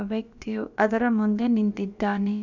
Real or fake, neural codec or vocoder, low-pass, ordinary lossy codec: fake; codec, 16 kHz, about 1 kbps, DyCAST, with the encoder's durations; 7.2 kHz; none